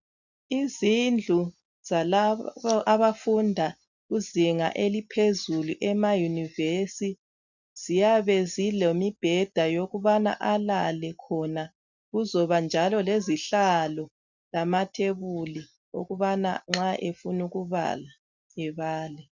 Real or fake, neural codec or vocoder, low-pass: real; none; 7.2 kHz